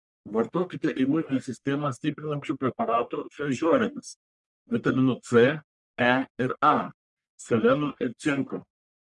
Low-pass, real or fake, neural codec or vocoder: 10.8 kHz; fake; codec, 44.1 kHz, 1.7 kbps, Pupu-Codec